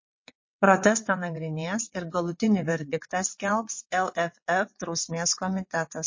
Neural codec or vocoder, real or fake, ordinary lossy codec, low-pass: vocoder, 22.05 kHz, 80 mel bands, WaveNeXt; fake; MP3, 32 kbps; 7.2 kHz